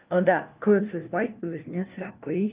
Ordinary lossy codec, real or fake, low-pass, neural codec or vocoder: Opus, 32 kbps; fake; 3.6 kHz; codec, 16 kHz, 1 kbps, FunCodec, trained on LibriTTS, 50 frames a second